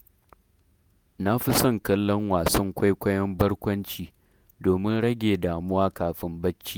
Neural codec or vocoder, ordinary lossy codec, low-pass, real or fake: vocoder, 48 kHz, 128 mel bands, Vocos; none; none; fake